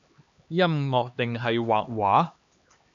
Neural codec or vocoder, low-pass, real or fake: codec, 16 kHz, 2 kbps, X-Codec, HuBERT features, trained on LibriSpeech; 7.2 kHz; fake